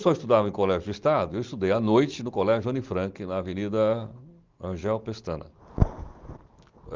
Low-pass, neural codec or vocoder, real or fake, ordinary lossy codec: 7.2 kHz; none; real; Opus, 32 kbps